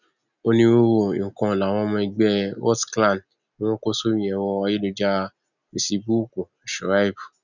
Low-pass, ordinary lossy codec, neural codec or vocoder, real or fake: 7.2 kHz; none; none; real